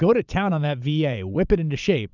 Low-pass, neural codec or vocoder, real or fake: 7.2 kHz; vocoder, 22.05 kHz, 80 mel bands, Vocos; fake